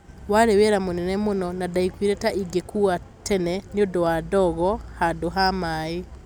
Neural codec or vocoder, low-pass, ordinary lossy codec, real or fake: none; 19.8 kHz; none; real